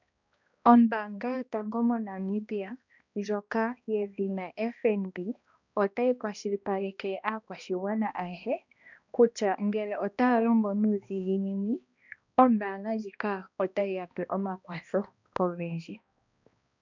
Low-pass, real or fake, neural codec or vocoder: 7.2 kHz; fake; codec, 16 kHz, 1 kbps, X-Codec, HuBERT features, trained on balanced general audio